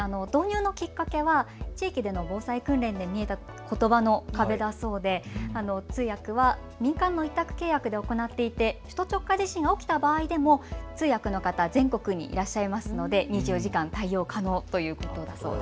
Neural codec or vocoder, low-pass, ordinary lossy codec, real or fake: none; none; none; real